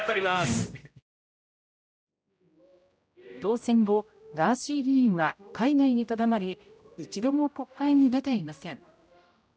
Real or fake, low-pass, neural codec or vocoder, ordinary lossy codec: fake; none; codec, 16 kHz, 0.5 kbps, X-Codec, HuBERT features, trained on general audio; none